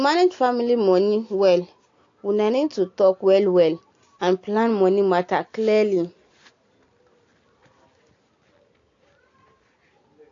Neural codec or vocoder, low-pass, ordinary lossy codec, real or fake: none; 7.2 kHz; AAC, 48 kbps; real